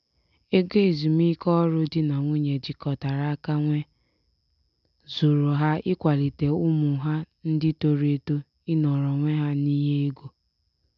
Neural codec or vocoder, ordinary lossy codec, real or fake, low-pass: none; AAC, 96 kbps; real; 7.2 kHz